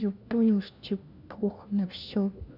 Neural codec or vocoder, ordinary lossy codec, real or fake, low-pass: codec, 16 kHz, 1 kbps, FunCodec, trained on LibriTTS, 50 frames a second; none; fake; 5.4 kHz